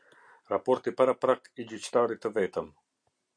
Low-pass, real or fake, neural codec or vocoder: 9.9 kHz; real; none